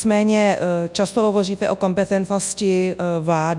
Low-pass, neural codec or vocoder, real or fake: 10.8 kHz; codec, 24 kHz, 0.9 kbps, WavTokenizer, large speech release; fake